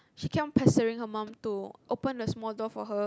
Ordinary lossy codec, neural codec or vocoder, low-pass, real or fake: none; none; none; real